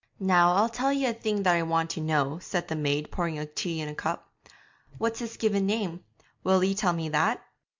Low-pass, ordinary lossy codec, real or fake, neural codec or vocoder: 7.2 kHz; MP3, 64 kbps; real; none